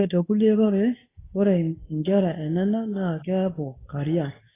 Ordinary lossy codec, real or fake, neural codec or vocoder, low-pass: AAC, 16 kbps; fake; codec, 24 kHz, 0.9 kbps, WavTokenizer, medium speech release version 2; 3.6 kHz